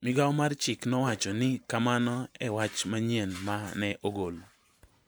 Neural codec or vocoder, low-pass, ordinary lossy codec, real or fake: vocoder, 44.1 kHz, 128 mel bands every 512 samples, BigVGAN v2; none; none; fake